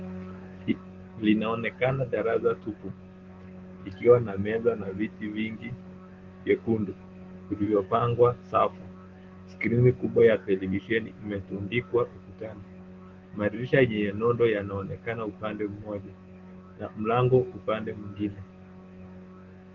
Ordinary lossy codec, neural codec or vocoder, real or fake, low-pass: Opus, 16 kbps; autoencoder, 48 kHz, 128 numbers a frame, DAC-VAE, trained on Japanese speech; fake; 7.2 kHz